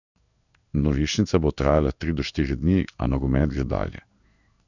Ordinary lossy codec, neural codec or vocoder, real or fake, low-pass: none; codec, 16 kHz in and 24 kHz out, 1 kbps, XY-Tokenizer; fake; 7.2 kHz